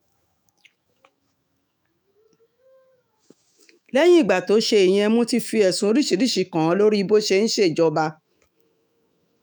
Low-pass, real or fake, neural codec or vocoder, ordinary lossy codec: none; fake; autoencoder, 48 kHz, 128 numbers a frame, DAC-VAE, trained on Japanese speech; none